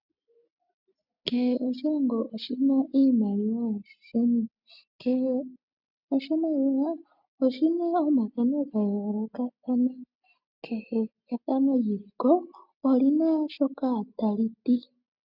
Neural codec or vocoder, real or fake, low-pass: none; real; 5.4 kHz